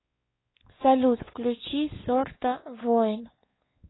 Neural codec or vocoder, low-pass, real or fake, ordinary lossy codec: codec, 16 kHz, 4 kbps, X-Codec, WavLM features, trained on Multilingual LibriSpeech; 7.2 kHz; fake; AAC, 16 kbps